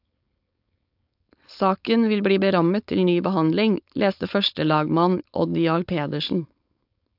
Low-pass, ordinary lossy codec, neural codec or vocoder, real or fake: 5.4 kHz; AAC, 48 kbps; codec, 16 kHz, 4.8 kbps, FACodec; fake